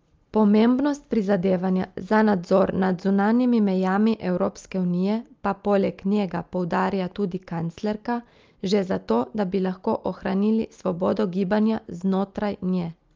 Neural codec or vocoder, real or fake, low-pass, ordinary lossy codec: none; real; 7.2 kHz; Opus, 24 kbps